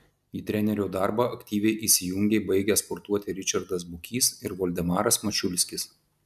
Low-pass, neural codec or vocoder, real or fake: 14.4 kHz; none; real